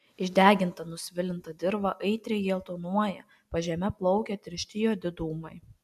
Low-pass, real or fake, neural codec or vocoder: 14.4 kHz; real; none